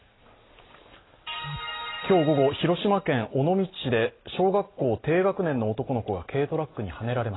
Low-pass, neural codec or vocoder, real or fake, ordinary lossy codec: 7.2 kHz; none; real; AAC, 16 kbps